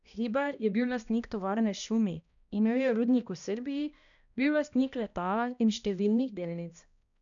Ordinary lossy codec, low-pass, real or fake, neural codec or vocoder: MP3, 96 kbps; 7.2 kHz; fake; codec, 16 kHz, 1 kbps, X-Codec, HuBERT features, trained on balanced general audio